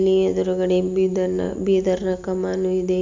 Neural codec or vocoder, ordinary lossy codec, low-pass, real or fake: none; MP3, 64 kbps; 7.2 kHz; real